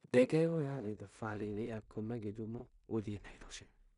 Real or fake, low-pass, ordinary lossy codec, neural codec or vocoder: fake; 10.8 kHz; none; codec, 16 kHz in and 24 kHz out, 0.4 kbps, LongCat-Audio-Codec, two codebook decoder